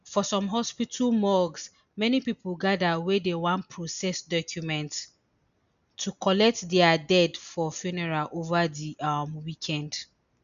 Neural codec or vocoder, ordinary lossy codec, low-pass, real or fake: none; none; 7.2 kHz; real